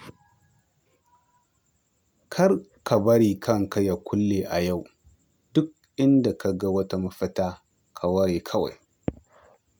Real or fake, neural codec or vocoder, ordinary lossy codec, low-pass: real; none; none; none